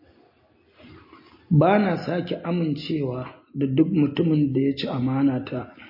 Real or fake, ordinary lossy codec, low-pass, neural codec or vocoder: real; MP3, 24 kbps; 5.4 kHz; none